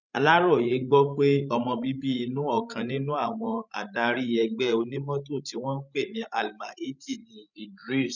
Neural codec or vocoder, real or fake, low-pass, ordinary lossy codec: codec, 16 kHz, 16 kbps, FreqCodec, larger model; fake; 7.2 kHz; none